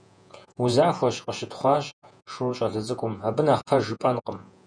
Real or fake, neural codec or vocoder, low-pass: fake; vocoder, 48 kHz, 128 mel bands, Vocos; 9.9 kHz